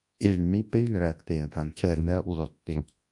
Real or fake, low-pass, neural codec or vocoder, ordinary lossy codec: fake; 10.8 kHz; codec, 24 kHz, 0.9 kbps, WavTokenizer, large speech release; AAC, 64 kbps